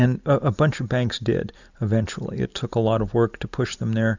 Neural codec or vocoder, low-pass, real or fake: none; 7.2 kHz; real